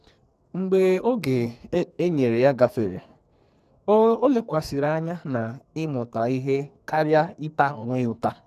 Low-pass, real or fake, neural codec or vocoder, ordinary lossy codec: 14.4 kHz; fake; codec, 44.1 kHz, 2.6 kbps, SNAC; none